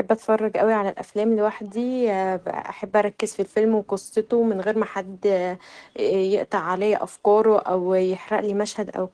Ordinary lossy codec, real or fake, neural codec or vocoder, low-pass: Opus, 16 kbps; real; none; 9.9 kHz